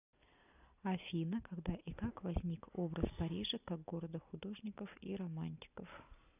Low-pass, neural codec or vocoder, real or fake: 3.6 kHz; none; real